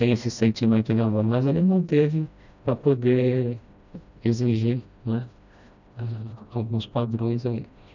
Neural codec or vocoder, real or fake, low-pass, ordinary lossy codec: codec, 16 kHz, 1 kbps, FreqCodec, smaller model; fake; 7.2 kHz; none